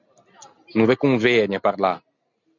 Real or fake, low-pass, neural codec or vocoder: real; 7.2 kHz; none